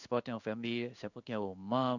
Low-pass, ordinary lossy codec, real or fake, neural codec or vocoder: 7.2 kHz; none; fake; codec, 16 kHz in and 24 kHz out, 1 kbps, XY-Tokenizer